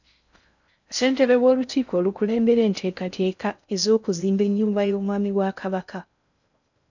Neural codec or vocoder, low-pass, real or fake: codec, 16 kHz in and 24 kHz out, 0.6 kbps, FocalCodec, streaming, 4096 codes; 7.2 kHz; fake